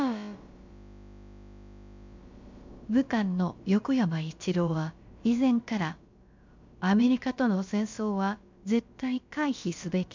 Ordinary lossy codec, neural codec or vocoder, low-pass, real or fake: MP3, 64 kbps; codec, 16 kHz, about 1 kbps, DyCAST, with the encoder's durations; 7.2 kHz; fake